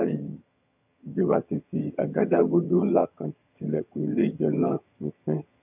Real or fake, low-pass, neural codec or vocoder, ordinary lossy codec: fake; 3.6 kHz; vocoder, 22.05 kHz, 80 mel bands, HiFi-GAN; none